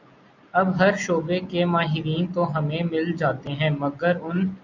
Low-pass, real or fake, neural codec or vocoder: 7.2 kHz; real; none